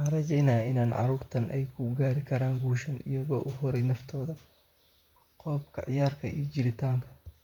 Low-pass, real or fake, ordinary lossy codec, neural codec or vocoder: 19.8 kHz; fake; none; vocoder, 44.1 kHz, 128 mel bands, Pupu-Vocoder